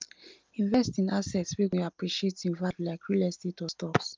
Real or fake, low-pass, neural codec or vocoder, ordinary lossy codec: real; 7.2 kHz; none; Opus, 24 kbps